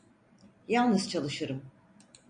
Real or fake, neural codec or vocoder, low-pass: real; none; 9.9 kHz